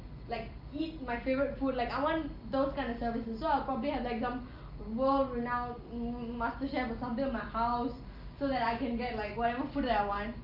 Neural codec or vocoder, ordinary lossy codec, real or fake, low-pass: none; Opus, 24 kbps; real; 5.4 kHz